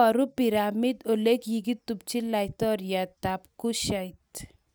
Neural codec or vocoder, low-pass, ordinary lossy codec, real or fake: none; none; none; real